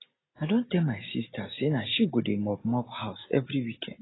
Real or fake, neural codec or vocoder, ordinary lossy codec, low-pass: real; none; AAC, 16 kbps; 7.2 kHz